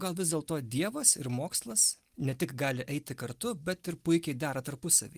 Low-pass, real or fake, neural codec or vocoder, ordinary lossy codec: 14.4 kHz; real; none; Opus, 24 kbps